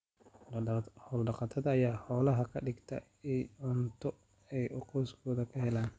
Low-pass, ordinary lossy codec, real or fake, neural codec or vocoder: none; none; real; none